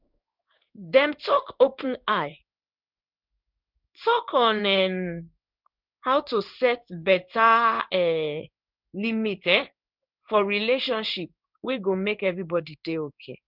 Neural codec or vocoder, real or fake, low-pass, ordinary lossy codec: codec, 16 kHz in and 24 kHz out, 1 kbps, XY-Tokenizer; fake; 5.4 kHz; none